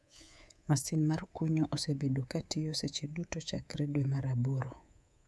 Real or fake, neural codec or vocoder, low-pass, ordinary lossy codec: fake; codec, 24 kHz, 3.1 kbps, DualCodec; none; none